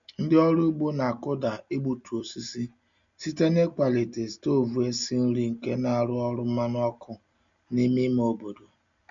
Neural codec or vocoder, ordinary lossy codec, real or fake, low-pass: none; MP3, 64 kbps; real; 7.2 kHz